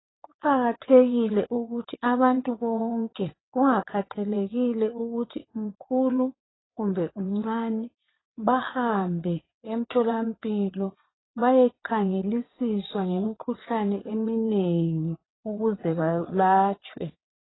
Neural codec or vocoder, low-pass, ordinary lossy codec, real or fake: vocoder, 22.05 kHz, 80 mel bands, WaveNeXt; 7.2 kHz; AAC, 16 kbps; fake